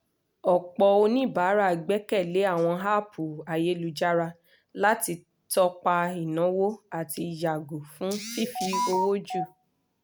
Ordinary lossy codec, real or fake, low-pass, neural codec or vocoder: none; real; none; none